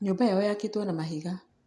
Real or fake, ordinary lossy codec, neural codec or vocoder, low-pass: real; none; none; none